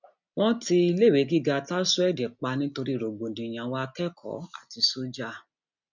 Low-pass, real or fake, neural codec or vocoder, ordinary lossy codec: 7.2 kHz; real; none; none